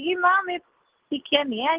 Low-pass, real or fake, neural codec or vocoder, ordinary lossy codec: 3.6 kHz; real; none; Opus, 16 kbps